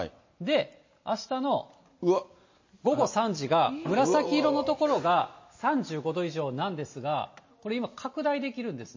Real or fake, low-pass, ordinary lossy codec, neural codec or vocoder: real; 7.2 kHz; MP3, 32 kbps; none